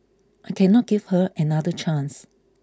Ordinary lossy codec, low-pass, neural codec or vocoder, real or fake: none; none; none; real